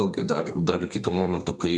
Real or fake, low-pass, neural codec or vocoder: fake; 10.8 kHz; codec, 32 kHz, 1.9 kbps, SNAC